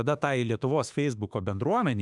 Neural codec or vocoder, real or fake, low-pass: autoencoder, 48 kHz, 32 numbers a frame, DAC-VAE, trained on Japanese speech; fake; 10.8 kHz